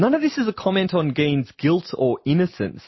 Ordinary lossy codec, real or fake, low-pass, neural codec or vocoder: MP3, 24 kbps; real; 7.2 kHz; none